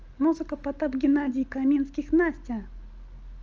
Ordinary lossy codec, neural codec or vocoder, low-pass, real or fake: Opus, 24 kbps; none; 7.2 kHz; real